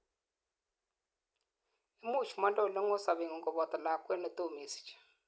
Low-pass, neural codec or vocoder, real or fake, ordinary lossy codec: none; none; real; none